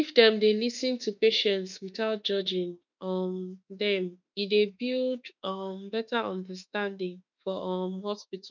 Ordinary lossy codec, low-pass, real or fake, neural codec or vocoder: none; 7.2 kHz; fake; autoencoder, 48 kHz, 32 numbers a frame, DAC-VAE, trained on Japanese speech